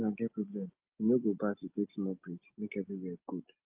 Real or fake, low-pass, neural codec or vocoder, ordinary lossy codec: real; 3.6 kHz; none; Opus, 32 kbps